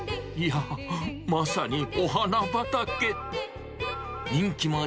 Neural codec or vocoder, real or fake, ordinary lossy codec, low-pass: none; real; none; none